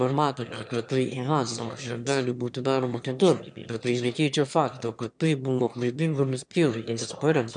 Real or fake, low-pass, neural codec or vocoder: fake; 9.9 kHz; autoencoder, 22.05 kHz, a latent of 192 numbers a frame, VITS, trained on one speaker